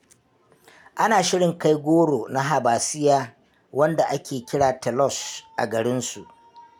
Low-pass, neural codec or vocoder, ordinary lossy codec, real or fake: 19.8 kHz; none; none; real